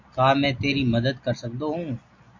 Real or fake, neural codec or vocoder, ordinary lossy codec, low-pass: real; none; AAC, 48 kbps; 7.2 kHz